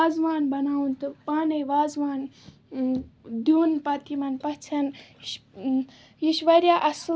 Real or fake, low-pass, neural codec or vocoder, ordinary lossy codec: real; none; none; none